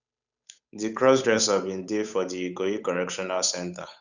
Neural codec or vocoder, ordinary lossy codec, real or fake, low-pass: codec, 16 kHz, 8 kbps, FunCodec, trained on Chinese and English, 25 frames a second; none; fake; 7.2 kHz